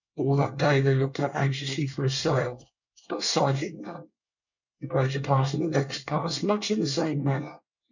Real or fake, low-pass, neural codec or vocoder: fake; 7.2 kHz; codec, 24 kHz, 1 kbps, SNAC